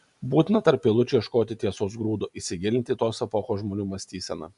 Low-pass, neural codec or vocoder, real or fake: 10.8 kHz; none; real